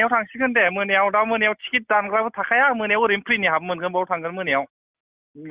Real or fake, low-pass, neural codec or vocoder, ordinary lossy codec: real; 3.6 kHz; none; Opus, 64 kbps